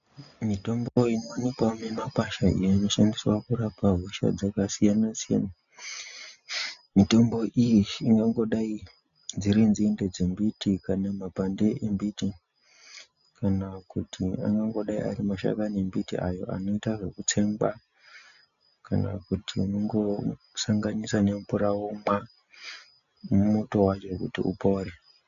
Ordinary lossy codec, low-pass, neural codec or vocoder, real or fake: MP3, 96 kbps; 7.2 kHz; none; real